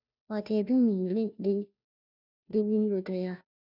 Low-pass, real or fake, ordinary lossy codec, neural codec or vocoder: 5.4 kHz; fake; none; codec, 16 kHz, 0.5 kbps, FunCodec, trained on Chinese and English, 25 frames a second